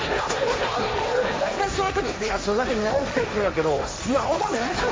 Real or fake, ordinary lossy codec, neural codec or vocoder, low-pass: fake; none; codec, 16 kHz, 1.1 kbps, Voila-Tokenizer; none